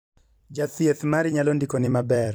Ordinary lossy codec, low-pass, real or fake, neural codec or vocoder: none; none; fake; vocoder, 44.1 kHz, 128 mel bands every 256 samples, BigVGAN v2